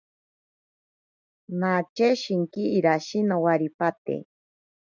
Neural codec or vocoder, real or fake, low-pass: none; real; 7.2 kHz